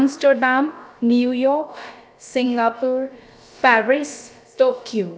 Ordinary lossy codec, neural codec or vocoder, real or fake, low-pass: none; codec, 16 kHz, about 1 kbps, DyCAST, with the encoder's durations; fake; none